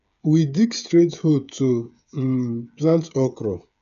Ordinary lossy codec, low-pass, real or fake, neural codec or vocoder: none; 7.2 kHz; fake; codec, 16 kHz, 8 kbps, FreqCodec, smaller model